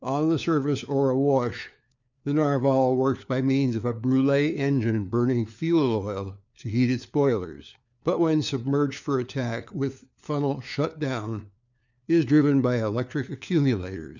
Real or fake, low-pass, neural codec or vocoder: fake; 7.2 kHz; codec, 16 kHz, 4 kbps, FunCodec, trained on LibriTTS, 50 frames a second